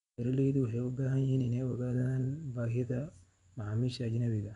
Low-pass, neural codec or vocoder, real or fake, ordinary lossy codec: 10.8 kHz; vocoder, 24 kHz, 100 mel bands, Vocos; fake; none